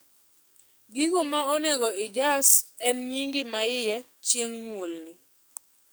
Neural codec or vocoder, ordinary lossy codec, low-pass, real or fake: codec, 44.1 kHz, 2.6 kbps, SNAC; none; none; fake